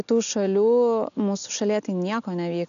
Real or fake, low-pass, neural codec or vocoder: real; 7.2 kHz; none